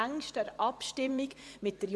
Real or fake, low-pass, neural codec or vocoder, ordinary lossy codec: fake; none; vocoder, 24 kHz, 100 mel bands, Vocos; none